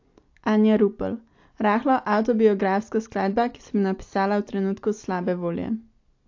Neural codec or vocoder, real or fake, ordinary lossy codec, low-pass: none; real; AAC, 48 kbps; 7.2 kHz